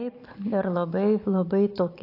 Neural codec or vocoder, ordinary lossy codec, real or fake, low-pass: none; AAC, 32 kbps; real; 5.4 kHz